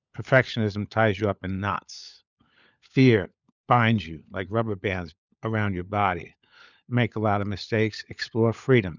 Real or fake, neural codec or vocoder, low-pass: fake; codec, 16 kHz, 16 kbps, FunCodec, trained on LibriTTS, 50 frames a second; 7.2 kHz